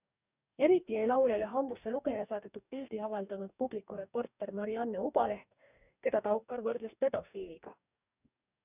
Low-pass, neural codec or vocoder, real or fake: 3.6 kHz; codec, 44.1 kHz, 2.6 kbps, DAC; fake